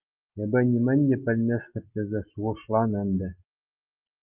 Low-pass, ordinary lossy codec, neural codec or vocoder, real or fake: 3.6 kHz; Opus, 32 kbps; none; real